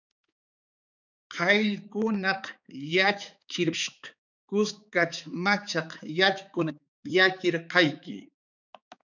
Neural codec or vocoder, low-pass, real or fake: codec, 16 kHz, 4 kbps, X-Codec, HuBERT features, trained on balanced general audio; 7.2 kHz; fake